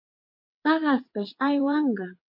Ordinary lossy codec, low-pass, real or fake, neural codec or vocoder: MP3, 32 kbps; 5.4 kHz; fake; vocoder, 22.05 kHz, 80 mel bands, WaveNeXt